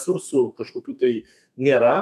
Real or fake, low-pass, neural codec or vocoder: fake; 14.4 kHz; codec, 32 kHz, 1.9 kbps, SNAC